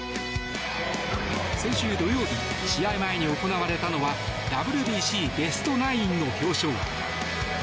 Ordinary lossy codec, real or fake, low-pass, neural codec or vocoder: none; real; none; none